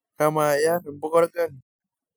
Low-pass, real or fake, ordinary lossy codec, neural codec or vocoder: none; real; none; none